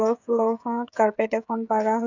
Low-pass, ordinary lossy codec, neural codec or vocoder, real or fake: 7.2 kHz; none; codec, 16 kHz, 16 kbps, FreqCodec, smaller model; fake